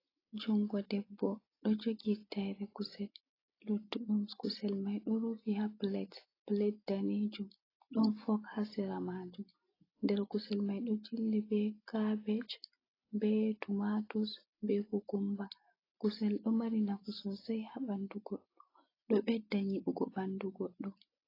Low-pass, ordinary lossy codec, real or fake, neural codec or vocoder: 5.4 kHz; AAC, 24 kbps; real; none